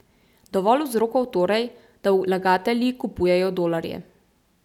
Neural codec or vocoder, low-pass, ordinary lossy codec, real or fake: none; 19.8 kHz; none; real